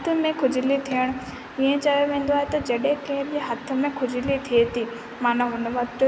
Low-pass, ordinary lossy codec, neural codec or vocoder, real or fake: none; none; none; real